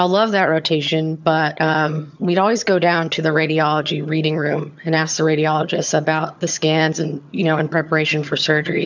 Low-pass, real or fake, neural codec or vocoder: 7.2 kHz; fake; vocoder, 22.05 kHz, 80 mel bands, HiFi-GAN